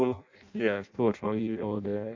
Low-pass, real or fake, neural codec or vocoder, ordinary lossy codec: 7.2 kHz; fake; codec, 16 kHz in and 24 kHz out, 0.6 kbps, FireRedTTS-2 codec; AAC, 48 kbps